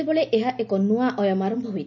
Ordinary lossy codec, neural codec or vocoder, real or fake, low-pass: none; none; real; 7.2 kHz